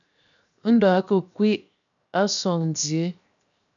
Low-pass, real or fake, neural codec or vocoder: 7.2 kHz; fake; codec, 16 kHz, 0.7 kbps, FocalCodec